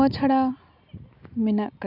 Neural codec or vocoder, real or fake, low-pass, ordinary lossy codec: none; real; 5.4 kHz; none